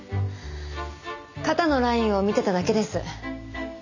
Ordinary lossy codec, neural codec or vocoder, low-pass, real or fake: none; none; 7.2 kHz; real